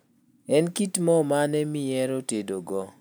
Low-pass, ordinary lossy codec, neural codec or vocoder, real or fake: none; none; none; real